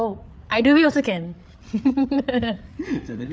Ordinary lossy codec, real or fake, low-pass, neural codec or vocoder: none; fake; none; codec, 16 kHz, 8 kbps, FreqCodec, larger model